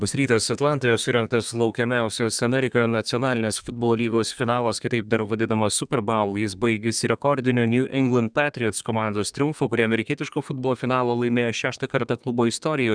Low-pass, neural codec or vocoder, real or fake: 9.9 kHz; codec, 32 kHz, 1.9 kbps, SNAC; fake